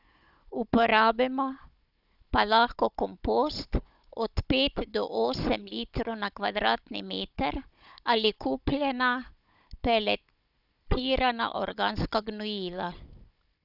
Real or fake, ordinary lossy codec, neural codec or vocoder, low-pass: fake; none; codec, 16 kHz, 16 kbps, FunCodec, trained on Chinese and English, 50 frames a second; 5.4 kHz